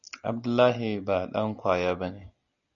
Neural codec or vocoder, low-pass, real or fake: none; 7.2 kHz; real